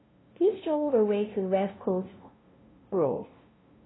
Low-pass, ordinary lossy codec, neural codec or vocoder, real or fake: 7.2 kHz; AAC, 16 kbps; codec, 16 kHz, 0.5 kbps, FunCodec, trained on LibriTTS, 25 frames a second; fake